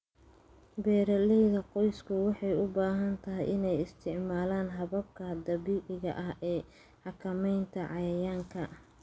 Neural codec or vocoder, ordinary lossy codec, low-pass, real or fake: none; none; none; real